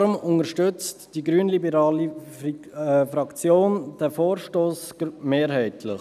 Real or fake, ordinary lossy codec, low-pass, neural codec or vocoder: real; none; 14.4 kHz; none